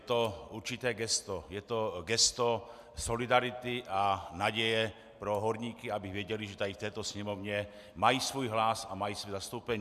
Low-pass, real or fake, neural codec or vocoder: 14.4 kHz; real; none